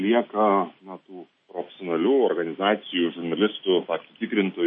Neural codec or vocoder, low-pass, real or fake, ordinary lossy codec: none; 9.9 kHz; real; MP3, 32 kbps